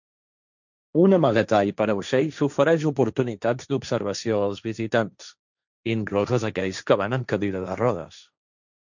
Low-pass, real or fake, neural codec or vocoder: 7.2 kHz; fake; codec, 16 kHz, 1.1 kbps, Voila-Tokenizer